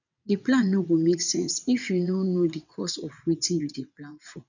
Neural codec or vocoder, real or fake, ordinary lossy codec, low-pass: vocoder, 22.05 kHz, 80 mel bands, WaveNeXt; fake; none; 7.2 kHz